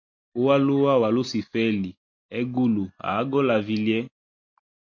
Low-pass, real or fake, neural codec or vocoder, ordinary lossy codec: 7.2 kHz; real; none; MP3, 48 kbps